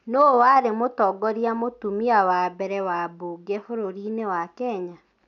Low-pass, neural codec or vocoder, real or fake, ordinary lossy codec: 7.2 kHz; none; real; none